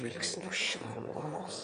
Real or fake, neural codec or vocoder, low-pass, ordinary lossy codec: fake; autoencoder, 22.05 kHz, a latent of 192 numbers a frame, VITS, trained on one speaker; 9.9 kHz; AAC, 48 kbps